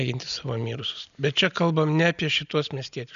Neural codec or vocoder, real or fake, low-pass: none; real; 7.2 kHz